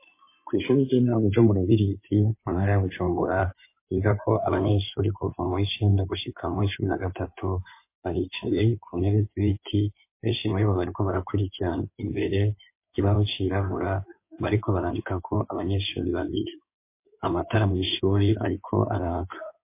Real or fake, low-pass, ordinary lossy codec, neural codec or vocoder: fake; 3.6 kHz; MP3, 24 kbps; codec, 16 kHz in and 24 kHz out, 2.2 kbps, FireRedTTS-2 codec